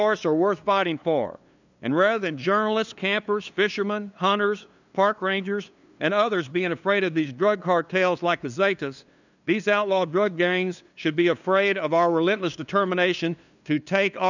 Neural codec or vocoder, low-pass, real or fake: codec, 16 kHz, 2 kbps, FunCodec, trained on LibriTTS, 25 frames a second; 7.2 kHz; fake